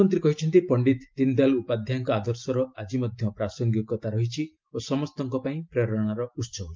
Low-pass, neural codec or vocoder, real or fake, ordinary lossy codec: 7.2 kHz; none; real; Opus, 24 kbps